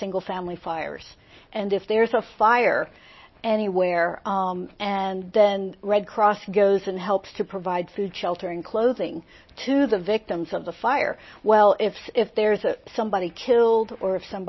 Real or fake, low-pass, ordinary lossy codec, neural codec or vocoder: real; 7.2 kHz; MP3, 24 kbps; none